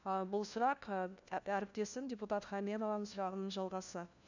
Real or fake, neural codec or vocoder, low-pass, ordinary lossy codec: fake; codec, 16 kHz, 1 kbps, FunCodec, trained on LibriTTS, 50 frames a second; 7.2 kHz; none